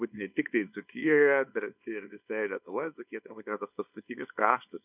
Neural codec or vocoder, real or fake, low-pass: codec, 24 kHz, 0.9 kbps, WavTokenizer, small release; fake; 3.6 kHz